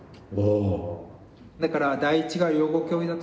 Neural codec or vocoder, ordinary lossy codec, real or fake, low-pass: none; none; real; none